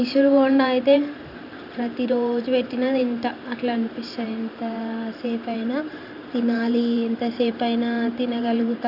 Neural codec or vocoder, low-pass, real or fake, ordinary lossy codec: vocoder, 44.1 kHz, 128 mel bands every 512 samples, BigVGAN v2; 5.4 kHz; fake; none